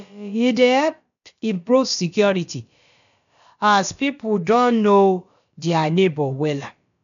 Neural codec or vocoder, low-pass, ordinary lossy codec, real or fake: codec, 16 kHz, about 1 kbps, DyCAST, with the encoder's durations; 7.2 kHz; MP3, 96 kbps; fake